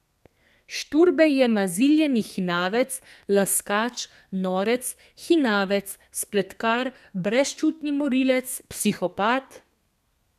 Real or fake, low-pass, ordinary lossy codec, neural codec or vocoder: fake; 14.4 kHz; none; codec, 32 kHz, 1.9 kbps, SNAC